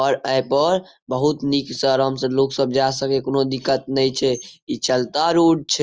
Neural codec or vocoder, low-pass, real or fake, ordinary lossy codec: none; 7.2 kHz; real; Opus, 24 kbps